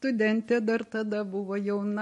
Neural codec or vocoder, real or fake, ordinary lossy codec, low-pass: none; real; MP3, 48 kbps; 14.4 kHz